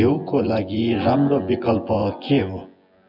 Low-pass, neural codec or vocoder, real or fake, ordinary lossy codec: 5.4 kHz; vocoder, 24 kHz, 100 mel bands, Vocos; fake; none